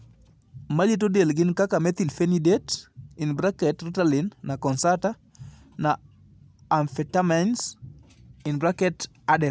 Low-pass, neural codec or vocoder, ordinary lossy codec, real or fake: none; none; none; real